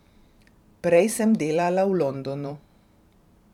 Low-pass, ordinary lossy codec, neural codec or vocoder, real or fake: 19.8 kHz; none; vocoder, 44.1 kHz, 128 mel bands every 512 samples, BigVGAN v2; fake